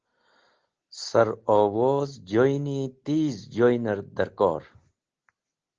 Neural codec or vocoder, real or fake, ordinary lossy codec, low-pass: none; real; Opus, 16 kbps; 7.2 kHz